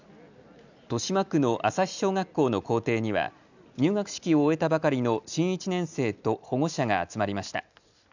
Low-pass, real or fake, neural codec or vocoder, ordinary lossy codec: 7.2 kHz; real; none; none